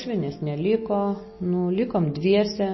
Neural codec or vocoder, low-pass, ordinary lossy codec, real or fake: none; 7.2 kHz; MP3, 24 kbps; real